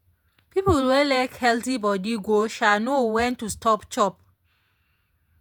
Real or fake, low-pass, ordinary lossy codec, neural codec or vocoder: fake; none; none; vocoder, 48 kHz, 128 mel bands, Vocos